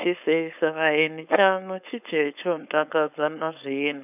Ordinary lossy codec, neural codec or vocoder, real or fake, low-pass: none; codec, 16 kHz, 4.8 kbps, FACodec; fake; 3.6 kHz